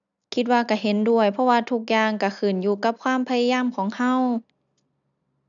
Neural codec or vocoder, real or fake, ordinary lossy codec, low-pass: none; real; none; 7.2 kHz